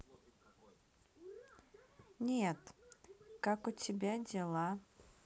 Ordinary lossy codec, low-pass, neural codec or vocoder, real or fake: none; none; none; real